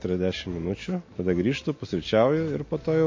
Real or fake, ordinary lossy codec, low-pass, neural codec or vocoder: real; MP3, 32 kbps; 7.2 kHz; none